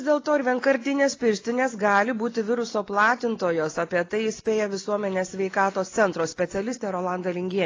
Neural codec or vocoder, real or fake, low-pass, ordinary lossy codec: none; real; 7.2 kHz; AAC, 32 kbps